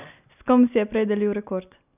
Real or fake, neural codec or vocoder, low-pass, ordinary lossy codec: real; none; 3.6 kHz; none